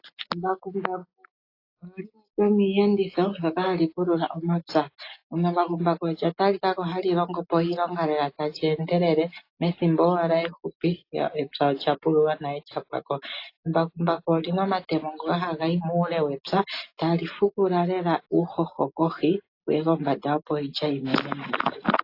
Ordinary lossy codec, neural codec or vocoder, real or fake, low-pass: AAC, 32 kbps; none; real; 5.4 kHz